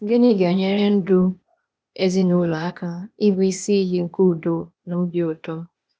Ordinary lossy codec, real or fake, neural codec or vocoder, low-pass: none; fake; codec, 16 kHz, 0.8 kbps, ZipCodec; none